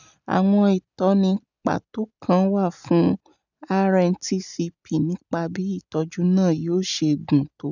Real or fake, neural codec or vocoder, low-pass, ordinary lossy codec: real; none; 7.2 kHz; none